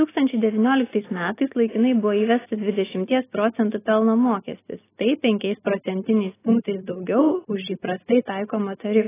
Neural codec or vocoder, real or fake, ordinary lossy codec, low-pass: none; real; AAC, 16 kbps; 3.6 kHz